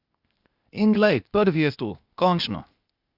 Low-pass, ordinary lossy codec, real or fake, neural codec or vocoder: 5.4 kHz; Opus, 64 kbps; fake; codec, 16 kHz, 0.8 kbps, ZipCodec